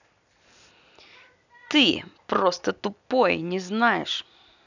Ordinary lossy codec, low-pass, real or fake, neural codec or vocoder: none; 7.2 kHz; real; none